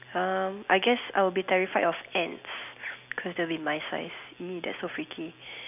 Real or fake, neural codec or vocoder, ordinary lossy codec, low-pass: real; none; none; 3.6 kHz